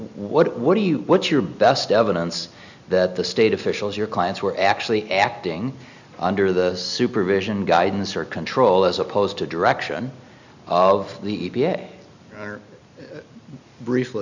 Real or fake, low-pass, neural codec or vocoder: real; 7.2 kHz; none